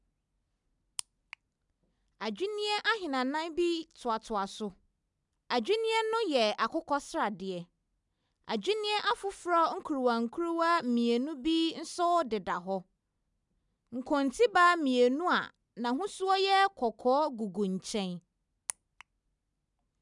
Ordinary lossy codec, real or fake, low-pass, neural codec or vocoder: none; real; 10.8 kHz; none